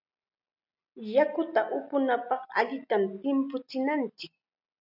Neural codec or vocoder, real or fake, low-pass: none; real; 5.4 kHz